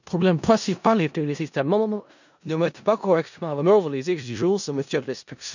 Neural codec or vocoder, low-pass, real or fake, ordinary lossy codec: codec, 16 kHz in and 24 kHz out, 0.4 kbps, LongCat-Audio-Codec, four codebook decoder; 7.2 kHz; fake; none